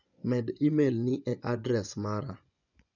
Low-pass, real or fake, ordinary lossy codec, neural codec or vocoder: 7.2 kHz; fake; none; vocoder, 24 kHz, 100 mel bands, Vocos